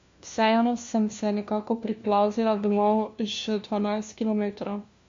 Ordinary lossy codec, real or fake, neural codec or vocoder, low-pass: MP3, 48 kbps; fake; codec, 16 kHz, 1 kbps, FunCodec, trained on LibriTTS, 50 frames a second; 7.2 kHz